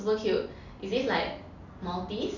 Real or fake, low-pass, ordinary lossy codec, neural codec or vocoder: real; 7.2 kHz; none; none